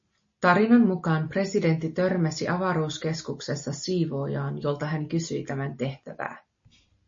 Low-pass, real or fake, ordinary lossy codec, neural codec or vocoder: 7.2 kHz; real; MP3, 32 kbps; none